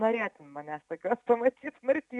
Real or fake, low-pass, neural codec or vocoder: real; 10.8 kHz; none